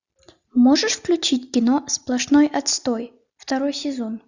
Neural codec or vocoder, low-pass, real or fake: none; 7.2 kHz; real